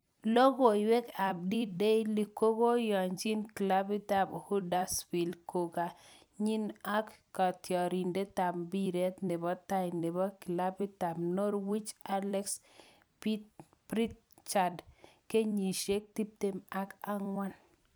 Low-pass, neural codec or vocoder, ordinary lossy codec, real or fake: none; vocoder, 44.1 kHz, 128 mel bands every 256 samples, BigVGAN v2; none; fake